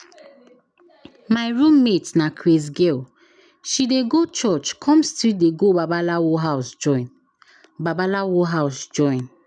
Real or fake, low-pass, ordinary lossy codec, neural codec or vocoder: real; 9.9 kHz; none; none